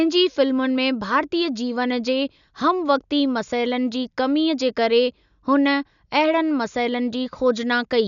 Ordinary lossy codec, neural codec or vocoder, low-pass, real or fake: none; none; 7.2 kHz; real